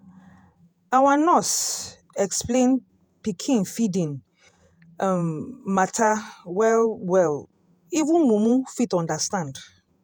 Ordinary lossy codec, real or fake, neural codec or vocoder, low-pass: none; fake; vocoder, 48 kHz, 128 mel bands, Vocos; none